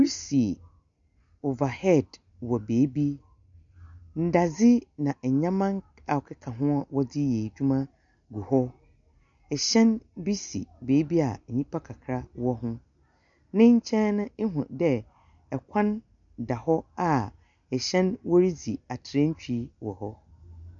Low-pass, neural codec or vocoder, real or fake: 7.2 kHz; none; real